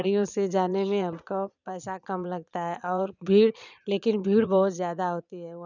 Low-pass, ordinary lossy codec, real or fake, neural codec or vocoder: 7.2 kHz; none; fake; vocoder, 22.05 kHz, 80 mel bands, Vocos